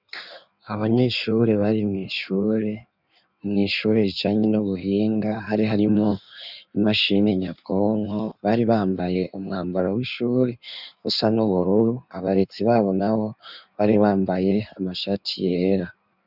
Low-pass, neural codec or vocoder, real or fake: 5.4 kHz; codec, 16 kHz in and 24 kHz out, 1.1 kbps, FireRedTTS-2 codec; fake